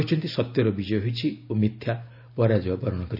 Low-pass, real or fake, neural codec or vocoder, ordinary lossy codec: 5.4 kHz; real; none; none